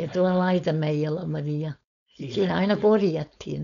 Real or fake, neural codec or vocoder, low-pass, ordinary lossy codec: fake; codec, 16 kHz, 4.8 kbps, FACodec; 7.2 kHz; none